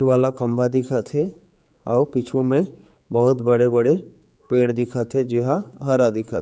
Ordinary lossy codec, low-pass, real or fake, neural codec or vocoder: none; none; fake; codec, 16 kHz, 4 kbps, X-Codec, HuBERT features, trained on general audio